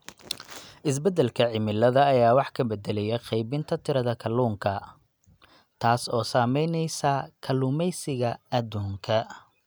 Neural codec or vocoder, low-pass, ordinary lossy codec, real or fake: none; none; none; real